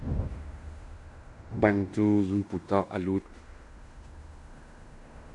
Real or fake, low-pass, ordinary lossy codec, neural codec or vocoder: fake; 10.8 kHz; MP3, 96 kbps; codec, 16 kHz in and 24 kHz out, 0.9 kbps, LongCat-Audio-Codec, fine tuned four codebook decoder